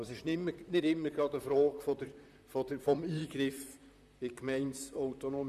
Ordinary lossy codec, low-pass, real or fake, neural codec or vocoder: none; 14.4 kHz; fake; vocoder, 44.1 kHz, 128 mel bands, Pupu-Vocoder